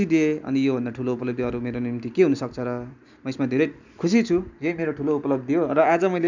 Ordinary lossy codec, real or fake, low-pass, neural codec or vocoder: none; real; 7.2 kHz; none